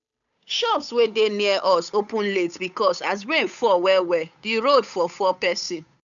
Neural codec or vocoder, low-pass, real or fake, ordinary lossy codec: codec, 16 kHz, 8 kbps, FunCodec, trained on Chinese and English, 25 frames a second; 7.2 kHz; fake; none